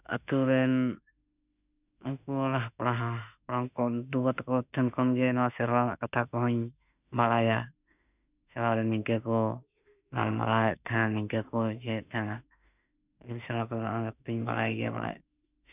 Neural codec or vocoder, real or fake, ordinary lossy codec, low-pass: autoencoder, 48 kHz, 32 numbers a frame, DAC-VAE, trained on Japanese speech; fake; none; 3.6 kHz